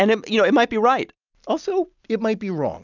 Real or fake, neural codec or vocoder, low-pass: real; none; 7.2 kHz